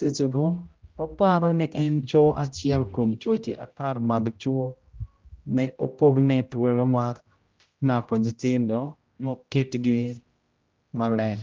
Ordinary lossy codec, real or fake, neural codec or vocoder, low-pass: Opus, 24 kbps; fake; codec, 16 kHz, 0.5 kbps, X-Codec, HuBERT features, trained on general audio; 7.2 kHz